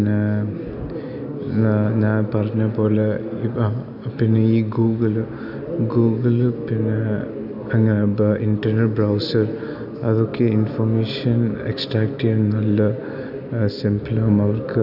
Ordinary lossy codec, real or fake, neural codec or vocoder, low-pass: none; real; none; 5.4 kHz